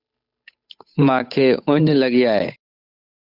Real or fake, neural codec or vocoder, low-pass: fake; codec, 16 kHz, 8 kbps, FunCodec, trained on Chinese and English, 25 frames a second; 5.4 kHz